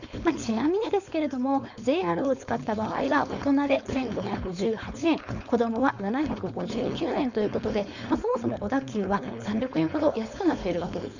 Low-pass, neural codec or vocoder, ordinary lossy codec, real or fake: 7.2 kHz; codec, 16 kHz, 4.8 kbps, FACodec; none; fake